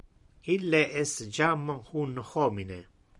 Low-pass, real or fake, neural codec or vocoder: 10.8 kHz; fake; vocoder, 44.1 kHz, 128 mel bands every 512 samples, BigVGAN v2